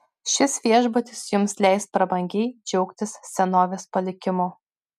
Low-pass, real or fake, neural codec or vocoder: 14.4 kHz; real; none